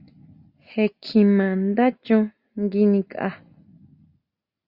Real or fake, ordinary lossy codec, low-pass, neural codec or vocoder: real; Opus, 64 kbps; 5.4 kHz; none